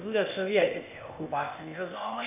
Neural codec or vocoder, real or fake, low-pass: codec, 16 kHz, 0.8 kbps, ZipCodec; fake; 3.6 kHz